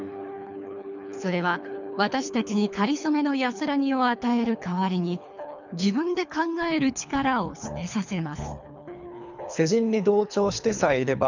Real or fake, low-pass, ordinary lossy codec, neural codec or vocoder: fake; 7.2 kHz; none; codec, 24 kHz, 3 kbps, HILCodec